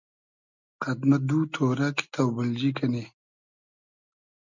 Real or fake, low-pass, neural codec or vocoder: real; 7.2 kHz; none